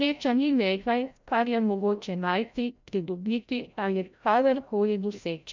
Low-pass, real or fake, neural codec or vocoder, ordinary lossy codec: 7.2 kHz; fake; codec, 16 kHz, 0.5 kbps, FreqCodec, larger model; MP3, 64 kbps